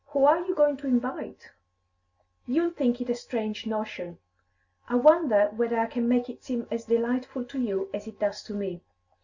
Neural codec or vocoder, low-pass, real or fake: none; 7.2 kHz; real